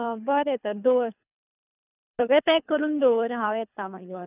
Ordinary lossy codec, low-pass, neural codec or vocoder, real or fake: none; 3.6 kHz; codec, 24 kHz, 3 kbps, HILCodec; fake